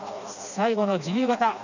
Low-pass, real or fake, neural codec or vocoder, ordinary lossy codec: 7.2 kHz; fake; codec, 16 kHz, 2 kbps, FreqCodec, smaller model; none